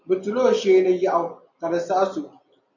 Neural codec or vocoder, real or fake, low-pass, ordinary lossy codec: none; real; 7.2 kHz; MP3, 48 kbps